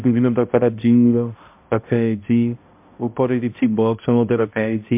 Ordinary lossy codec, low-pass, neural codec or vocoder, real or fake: MP3, 32 kbps; 3.6 kHz; codec, 16 kHz, 0.5 kbps, X-Codec, HuBERT features, trained on balanced general audio; fake